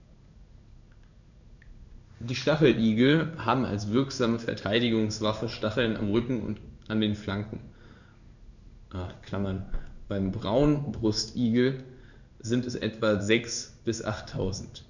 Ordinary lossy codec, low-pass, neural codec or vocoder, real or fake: none; 7.2 kHz; codec, 16 kHz in and 24 kHz out, 1 kbps, XY-Tokenizer; fake